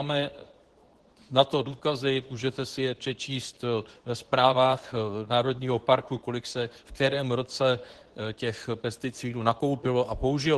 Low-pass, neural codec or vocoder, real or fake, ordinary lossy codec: 10.8 kHz; codec, 24 kHz, 0.9 kbps, WavTokenizer, medium speech release version 2; fake; Opus, 16 kbps